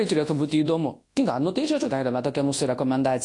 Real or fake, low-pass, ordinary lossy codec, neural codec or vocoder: fake; 10.8 kHz; AAC, 48 kbps; codec, 24 kHz, 0.9 kbps, WavTokenizer, large speech release